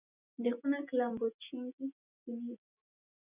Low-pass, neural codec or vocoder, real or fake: 3.6 kHz; none; real